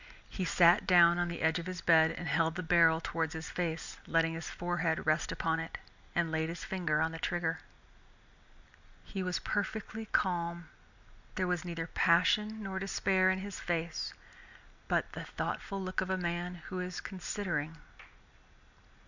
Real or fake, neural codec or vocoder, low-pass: real; none; 7.2 kHz